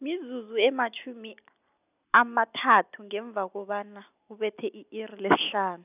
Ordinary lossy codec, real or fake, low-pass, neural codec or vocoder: none; real; 3.6 kHz; none